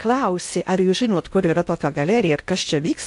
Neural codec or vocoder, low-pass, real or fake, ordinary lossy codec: codec, 16 kHz in and 24 kHz out, 0.6 kbps, FocalCodec, streaming, 2048 codes; 10.8 kHz; fake; AAC, 64 kbps